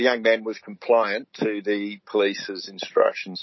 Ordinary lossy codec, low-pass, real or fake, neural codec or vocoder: MP3, 24 kbps; 7.2 kHz; real; none